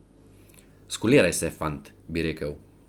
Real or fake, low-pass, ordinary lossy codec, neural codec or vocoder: real; 19.8 kHz; Opus, 32 kbps; none